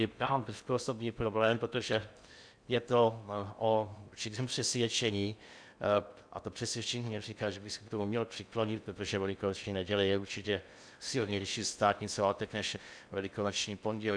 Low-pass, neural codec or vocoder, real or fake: 9.9 kHz; codec, 16 kHz in and 24 kHz out, 0.6 kbps, FocalCodec, streaming, 2048 codes; fake